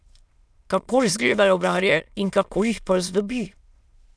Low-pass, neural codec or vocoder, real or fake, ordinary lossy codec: none; autoencoder, 22.05 kHz, a latent of 192 numbers a frame, VITS, trained on many speakers; fake; none